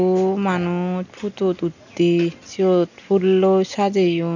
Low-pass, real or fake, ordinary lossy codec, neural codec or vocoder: 7.2 kHz; real; none; none